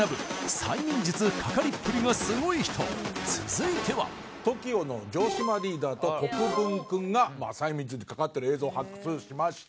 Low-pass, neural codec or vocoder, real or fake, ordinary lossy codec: none; none; real; none